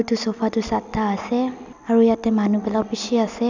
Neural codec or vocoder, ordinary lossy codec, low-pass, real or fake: none; none; 7.2 kHz; real